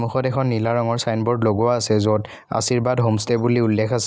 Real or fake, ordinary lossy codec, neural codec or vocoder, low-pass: real; none; none; none